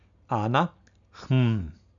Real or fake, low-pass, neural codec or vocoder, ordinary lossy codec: real; 7.2 kHz; none; none